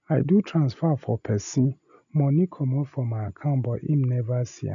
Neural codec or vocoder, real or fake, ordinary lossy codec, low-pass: none; real; none; 7.2 kHz